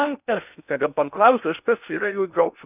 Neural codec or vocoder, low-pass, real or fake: codec, 16 kHz in and 24 kHz out, 0.6 kbps, FocalCodec, streaming, 2048 codes; 3.6 kHz; fake